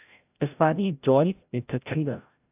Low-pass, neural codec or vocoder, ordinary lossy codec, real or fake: 3.6 kHz; codec, 16 kHz, 0.5 kbps, FreqCodec, larger model; AAC, 32 kbps; fake